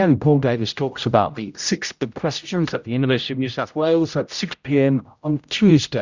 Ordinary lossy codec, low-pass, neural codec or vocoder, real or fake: Opus, 64 kbps; 7.2 kHz; codec, 16 kHz, 0.5 kbps, X-Codec, HuBERT features, trained on general audio; fake